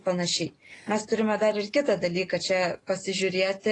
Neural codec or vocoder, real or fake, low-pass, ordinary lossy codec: none; real; 10.8 kHz; AAC, 32 kbps